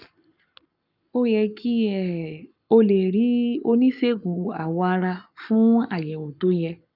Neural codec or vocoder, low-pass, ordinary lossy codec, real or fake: codec, 44.1 kHz, 7.8 kbps, Pupu-Codec; 5.4 kHz; none; fake